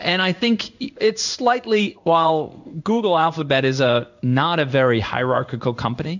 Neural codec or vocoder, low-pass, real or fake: codec, 16 kHz in and 24 kHz out, 1 kbps, XY-Tokenizer; 7.2 kHz; fake